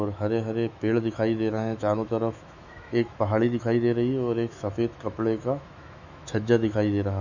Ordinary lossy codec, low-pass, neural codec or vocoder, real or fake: none; 7.2 kHz; autoencoder, 48 kHz, 128 numbers a frame, DAC-VAE, trained on Japanese speech; fake